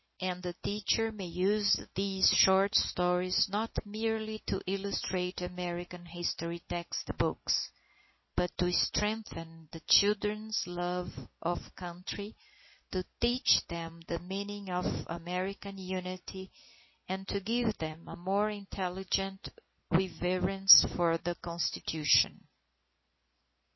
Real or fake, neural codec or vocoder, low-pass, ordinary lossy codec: real; none; 7.2 kHz; MP3, 24 kbps